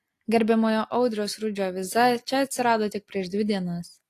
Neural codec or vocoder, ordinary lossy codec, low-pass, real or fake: none; AAC, 48 kbps; 14.4 kHz; real